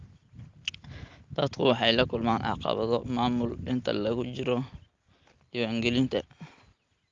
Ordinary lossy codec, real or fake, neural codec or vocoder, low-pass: Opus, 32 kbps; real; none; 7.2 kHz